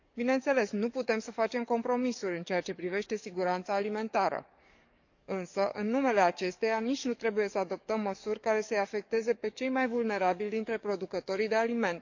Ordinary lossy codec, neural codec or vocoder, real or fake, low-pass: none; codec, 44.1 kHz, 7.8 kbps, DAC; fake; 7.2 kHz